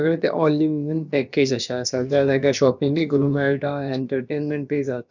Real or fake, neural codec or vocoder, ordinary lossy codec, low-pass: fake; codec, 16 kHz, about 1 kbps, DyCAST, with the encoder's durations; none; 7.2 kHz